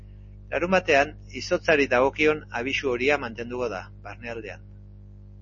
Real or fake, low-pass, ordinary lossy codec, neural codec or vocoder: real; 7.2 kHz; MP3, 32 kbps; none